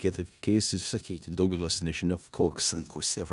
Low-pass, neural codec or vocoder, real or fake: 10.8 kHz; codec, 16 kHz in and 24 kHz out, 0.4 kbps, LongCat-Audio-Codec, four codebook decoder; fake